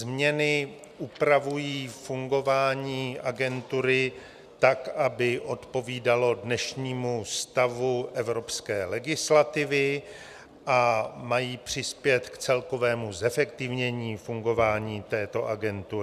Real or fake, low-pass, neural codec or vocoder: real; 14.4 kHz; none